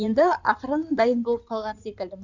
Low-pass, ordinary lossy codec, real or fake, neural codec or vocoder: 7.2 kHz; none; fake; codec, 16 kHz in and 24 kHz out, 2.2 kbps, FireRedTTS-2 codec